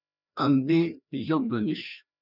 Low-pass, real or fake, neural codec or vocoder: 5.4 kHz; fake; codec, 16 kHz, 1 kbps, FreqCodec, larger model